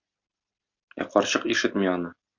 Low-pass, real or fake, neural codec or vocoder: 7.2 kHz; real; none